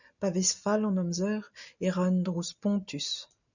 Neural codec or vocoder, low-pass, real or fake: none; 7.2 kHz; real